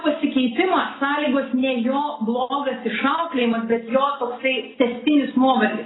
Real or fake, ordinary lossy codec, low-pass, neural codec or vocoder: real; AAC, 16 kbps; 7.2 kHz; none